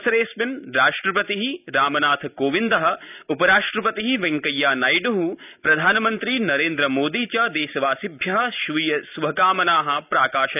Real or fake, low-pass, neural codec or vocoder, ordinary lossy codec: real; 3.6 kHz; none; none